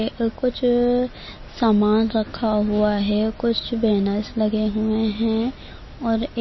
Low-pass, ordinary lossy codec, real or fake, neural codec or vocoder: 7.2 kHz; MP3, 24 kbps; real; none